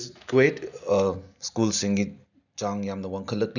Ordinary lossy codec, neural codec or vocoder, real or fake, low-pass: none; none; real; 7.2 kHz